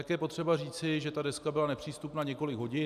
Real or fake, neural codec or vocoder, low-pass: real; none; 14.4 kHz